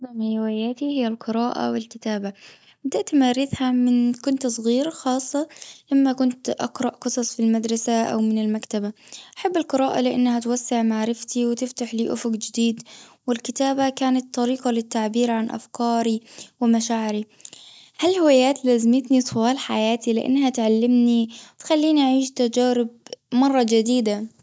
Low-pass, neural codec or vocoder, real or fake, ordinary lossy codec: none; none; real; none